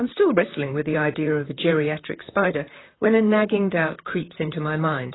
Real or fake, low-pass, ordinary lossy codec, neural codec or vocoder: fake; 7.2 kHz; AAC, 16 kbps; vocoder, 44.1 kHz, 128 mel bands, Pupu-Vocoder